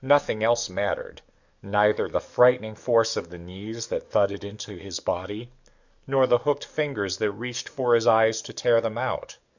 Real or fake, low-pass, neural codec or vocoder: fake; 7.2 kHz; codec, 44.1 kHz, 7.8 kbps, DAC